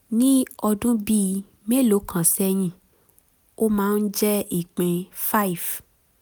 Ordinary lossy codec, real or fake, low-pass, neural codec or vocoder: none; real; none; none